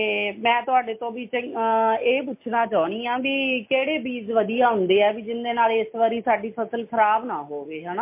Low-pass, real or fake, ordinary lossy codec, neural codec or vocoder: 3.6 kHz; real; MP3, 24 kbps; none